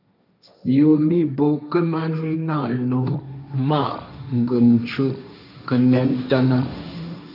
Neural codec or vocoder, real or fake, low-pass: codec, 16 kHz, 1.1 kbps, Voila-Tokenizer; fake; 5.4 kHz